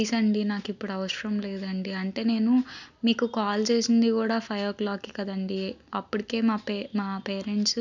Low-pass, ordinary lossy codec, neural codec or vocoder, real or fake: 7.2 kHz; none; none; real